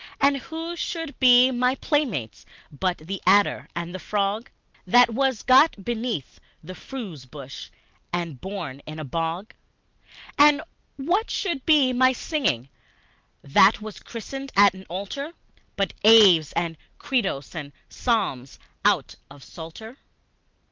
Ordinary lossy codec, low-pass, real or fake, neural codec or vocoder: Opus, 24 kbps; 7.2 kHz; real; none